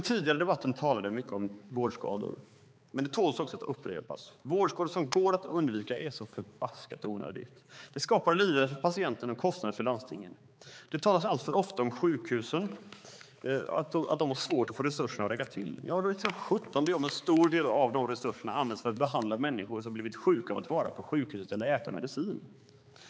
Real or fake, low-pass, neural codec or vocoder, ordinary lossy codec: fake; none; codec, 16 kHz, 4 kbps, X-Codec, HuBERT features, trained on balanced general audio; none